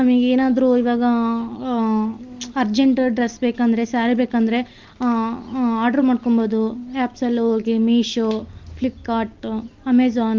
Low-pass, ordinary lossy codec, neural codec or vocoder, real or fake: 7.2 kHz; Opus, 32 kbps; none; real